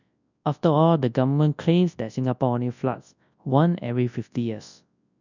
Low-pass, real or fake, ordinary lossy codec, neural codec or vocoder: 7.2 kHz; fake; none; codec, 24 kHz, 0.9 kbps, WavTokenizer, large speech release